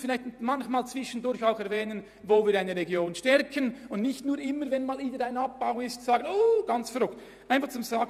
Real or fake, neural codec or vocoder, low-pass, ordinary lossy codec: fake; vocoder, 48 kHz, 128 mel bands, Vocos; 14.4 kHz; none